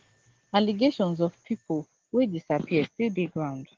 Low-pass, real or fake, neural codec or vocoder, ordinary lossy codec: 7.2 kHz; real; none; Opus, 16 kbps